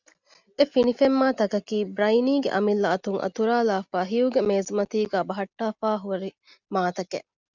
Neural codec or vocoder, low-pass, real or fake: none; 7.2 kHz; real